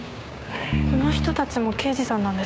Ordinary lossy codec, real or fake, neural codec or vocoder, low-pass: none; fake; codec, 16 kHz, 6 kbps, DAC; none